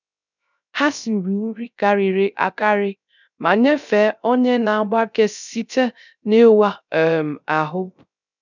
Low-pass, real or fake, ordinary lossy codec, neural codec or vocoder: 7.2 kHz; fake; none; codec, 16 kHz, 0.3 kbps, FocalCodec